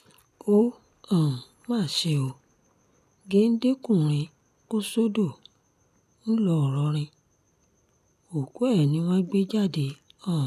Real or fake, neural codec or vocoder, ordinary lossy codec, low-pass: real; none; none; 14.4 kHz